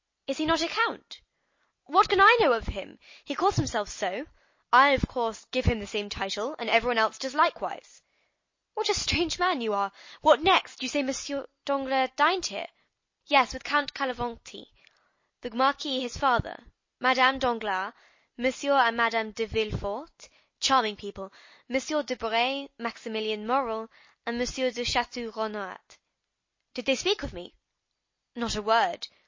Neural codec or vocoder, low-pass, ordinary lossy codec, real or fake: none; 7.2 kHz; MP3, 32 kbps; real